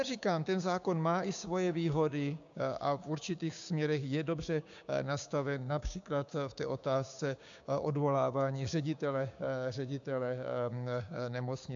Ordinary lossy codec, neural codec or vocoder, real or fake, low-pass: AAC, 64 kbps; codec, 16 kHz, 6 kbps, DAC; fake; 7.2 kHz